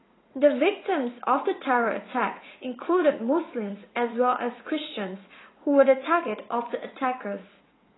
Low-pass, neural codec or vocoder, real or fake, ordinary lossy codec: 7.2 kHz; vocoder, 44.1 kHz, 80 mel bands, Vocos; fake; AAC, 16 kbps